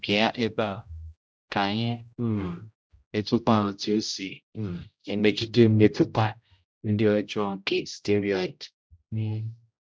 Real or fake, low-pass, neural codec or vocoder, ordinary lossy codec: fake; none; codec, 16 kHz, 0.5 kbps, X-Codec, HuBERT features, trained on general audio; none